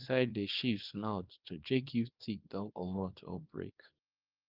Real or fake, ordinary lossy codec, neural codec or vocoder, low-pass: fake; Opus, 32 kbps; codec, 24 kHz, 0.9 kbps, WavTokenizer, small release; 5.4 kHz